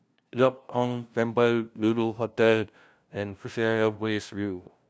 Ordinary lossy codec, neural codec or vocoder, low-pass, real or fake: none; codec, 16 kHz, 0.5 kbps, FunCodec, trained on LibriTTS, 25 frames a second; none; fake